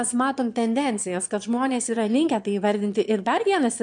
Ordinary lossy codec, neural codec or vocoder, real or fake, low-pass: MP3, 64 kbps; autoencoder, 22.05 kHz, a latent of 192 numbers a frame, VITS, trained on one speaker; fake; 9.9 kHz